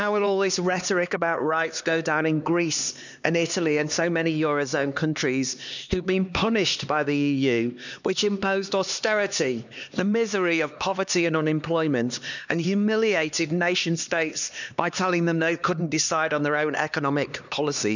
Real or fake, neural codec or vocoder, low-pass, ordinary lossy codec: fake; codec, 16 kHz, 2 kbps, X-Codec, HuBERT features, trained on LibriSpeech; 7.2 kHz; none